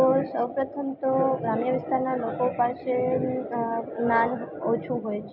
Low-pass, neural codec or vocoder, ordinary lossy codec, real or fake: 5.4 kHz; none; none; real